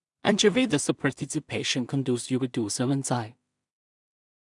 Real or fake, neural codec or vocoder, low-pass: fake; codec, 16 kHz in and 24 kHz out, 0.4 kbps, LongCat-Audio-Codec, two codebook decoder; 10.8 kHz